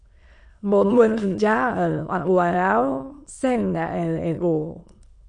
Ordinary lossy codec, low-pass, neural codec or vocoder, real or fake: MP3, 48 kbps; 9.9 kHz; autoencoder, 22.05 kHz, a latent of 192 numbers a frame, VITS, trained on many speakers; fake